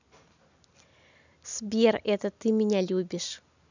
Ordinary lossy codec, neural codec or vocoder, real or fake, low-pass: none; none; real; 7.2 kHz